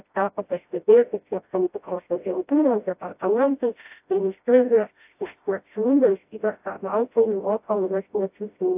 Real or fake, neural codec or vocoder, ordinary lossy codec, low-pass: fake; codec, 16 kHz, 0.5 kbps, FreqCodec, smaller model; AAC, 32 kbps; 3.6 kHz